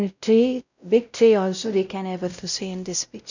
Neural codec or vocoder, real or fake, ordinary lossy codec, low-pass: codec, 16 kHz, 0.5 kbps, X-Codec, WavLM features, trained on Multilingual LibriSpeech; fake; none; 7.2 kHz